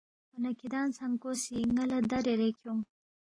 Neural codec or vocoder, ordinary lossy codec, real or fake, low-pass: none; AAC, 32 kbps; real; 9.9 kHz